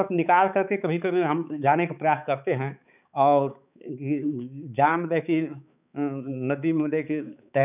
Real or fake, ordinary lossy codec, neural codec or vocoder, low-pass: fake; none; codec, 16 kHz, 4 kbps, X-Codec, HuBERT features, trained on balanced general audio; 3.6 kHz